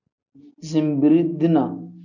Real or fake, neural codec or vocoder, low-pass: real; none; 7.2 kHz